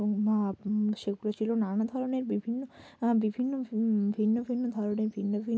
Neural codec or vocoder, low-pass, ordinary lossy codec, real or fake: none; none; none; real